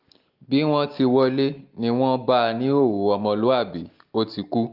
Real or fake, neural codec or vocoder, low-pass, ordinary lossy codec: real; none; 5.4 kHz; Opus, 32 kbps